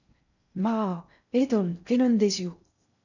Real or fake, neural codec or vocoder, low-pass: fake; codec, 16 kHz in and 24 kHz out, 0.6 kbps, FocalCodec, streaming, 4096 codes; 7.2 kHz